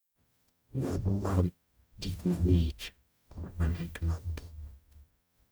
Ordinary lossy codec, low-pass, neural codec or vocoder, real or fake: none; none; codec, 44.1 kHz, 0.9 kbps, DAC; fake